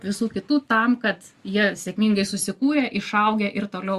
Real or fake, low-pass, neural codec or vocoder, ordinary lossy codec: real; 14.4 kHz; none; Opus, 64 kbps